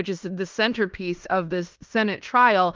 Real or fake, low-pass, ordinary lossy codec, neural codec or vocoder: fake; 7.2 kHz; Opus, 24 kbps; codec, 16 kHz in and 24 kHz out, 0.9 kbps, LongCat-Audio-Codec, fine tuned four codebook decoder